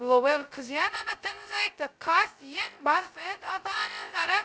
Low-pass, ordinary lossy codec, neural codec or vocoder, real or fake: none; none; codec, 16 kHz, 0.2 kbps, FocalCodec; fake